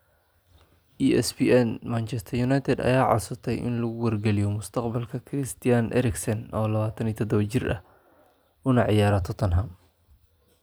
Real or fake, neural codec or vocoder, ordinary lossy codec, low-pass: real; none; none; none